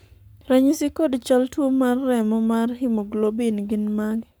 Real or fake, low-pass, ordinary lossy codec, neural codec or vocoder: fake; none; none; codec, 44.1 kHz, 7.8 kbps, Pupu-Codec